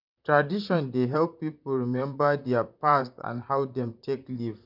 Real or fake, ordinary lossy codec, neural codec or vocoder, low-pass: fake; none; vocoder, 44.1 kHz, 128 mel bands, Pupu-Vocoder; 5.4 kHz